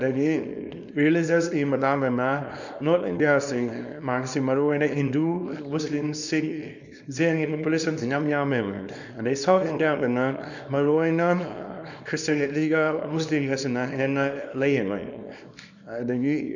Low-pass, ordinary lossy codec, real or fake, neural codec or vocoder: 7.2 kHz; none; fake; codec, 24 kHz, 0.9 kbps, WavTokenizer, small release